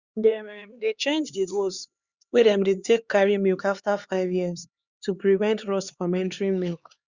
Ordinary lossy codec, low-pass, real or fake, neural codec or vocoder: Opus, 64 kbps; 7.2 kHz; fake; codec, 16 kHz, 4 kbps, X-Codec, HuBERT features, trained on LibriSpeech